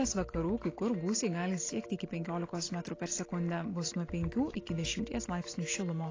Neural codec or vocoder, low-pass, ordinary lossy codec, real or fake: none; 7.2 kHz; AAC, 32 kbps; real